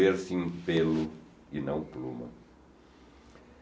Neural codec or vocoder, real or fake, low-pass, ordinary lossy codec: none; real; none; none